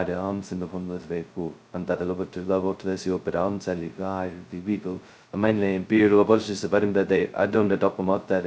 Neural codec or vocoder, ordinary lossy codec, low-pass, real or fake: codec, 16 kHz, 0.2 kbps, FocalCodec; none; none; fake